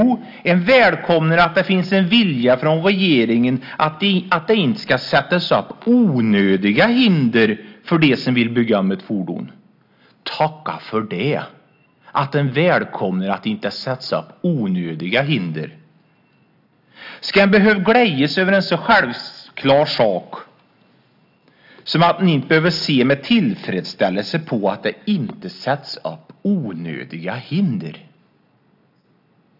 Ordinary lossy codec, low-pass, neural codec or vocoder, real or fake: AAC, 48 kbps; 5.4 kHz; none; real